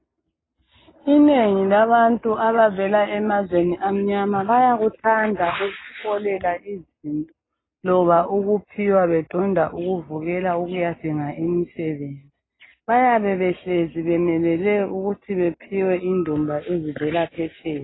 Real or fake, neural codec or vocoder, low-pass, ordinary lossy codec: real; none; 7.2 kHz; AAC, 16 kbps